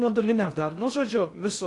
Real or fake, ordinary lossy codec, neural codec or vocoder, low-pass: fake; AAC, 48 kbps; codec, 16 kHz in and 24 kHz out, 0.6 kbps, FocalCodec, streaming, 2048 codes; 10.8 kHz